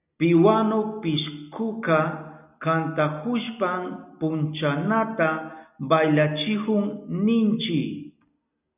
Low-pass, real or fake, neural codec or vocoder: 3.6 kHz; real; none